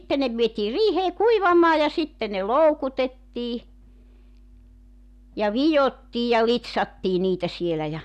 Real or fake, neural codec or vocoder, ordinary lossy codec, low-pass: real; none; none; 14.4 kHz